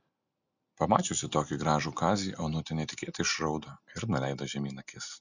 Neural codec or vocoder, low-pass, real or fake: none; 7.2 kHz; real